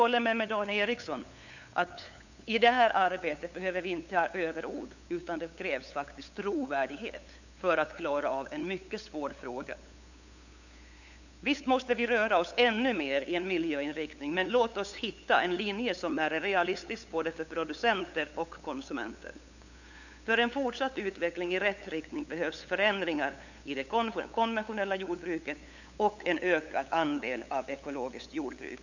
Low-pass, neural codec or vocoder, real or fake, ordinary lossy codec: 7.2 kHz; codec, 16 kHz, 8 kbps, FunCodec, trained on LibriTTS, 25 frames a second; fake; none